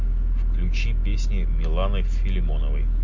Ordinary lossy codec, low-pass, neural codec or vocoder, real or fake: MP3, 48 kbps; 7.2 kHz; none; real